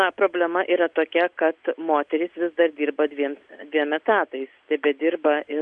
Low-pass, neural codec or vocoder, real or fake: 9.9 kHz; none; real